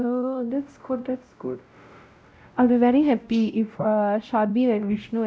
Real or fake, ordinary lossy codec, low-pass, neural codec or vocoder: fake; none; none; codec, 16 kHz, 0.5 kbps, X-Codec, WavLM features, trained on Multilingual LibriSpeech